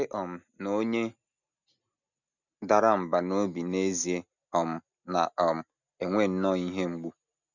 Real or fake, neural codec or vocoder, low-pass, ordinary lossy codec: real; none; 7.2 kHz; none